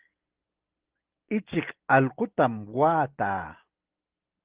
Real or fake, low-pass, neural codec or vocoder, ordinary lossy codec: real; 3.6 kHz; none; Opus, 16 kbps